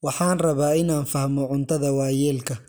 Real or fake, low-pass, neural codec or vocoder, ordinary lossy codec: real; none; none; none